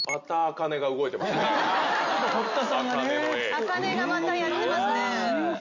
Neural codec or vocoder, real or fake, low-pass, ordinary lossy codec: none; real; 7.2 kHz; none